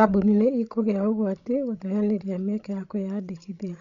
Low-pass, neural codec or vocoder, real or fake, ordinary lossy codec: 7.2 kHz; codec, 16 kHz, 16 kbps, FreqCodec, larger model; fake; none